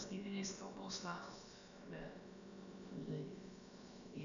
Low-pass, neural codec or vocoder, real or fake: 7.2 kHz; codec, 16 kHz, about 1 kbps, DyCAST, with the encoder's durations; fake